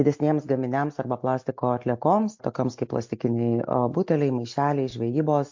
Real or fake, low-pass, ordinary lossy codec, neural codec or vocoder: real; 7.2 kHz; MP3, 48 kbps; none